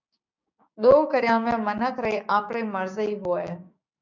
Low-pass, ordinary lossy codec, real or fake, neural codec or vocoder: 7.2 kHz; MP3, 64 kbps; fake; codec, 16 kHz, 6 kbps, DAC